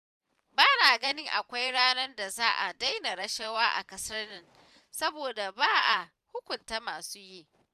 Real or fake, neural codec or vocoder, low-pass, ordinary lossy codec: fake; vocoder, 44.1 kHz, 128 mel bands every 512 samples, BigVGAN v2; 14.4 kHz; none